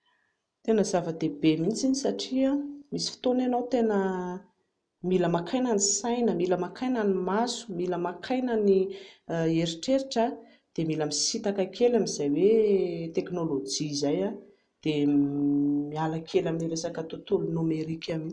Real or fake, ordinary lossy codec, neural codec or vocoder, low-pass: real; none; none; 9.9 kHz